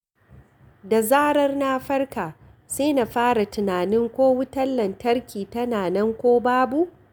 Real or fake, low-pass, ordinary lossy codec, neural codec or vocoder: real; none; none; none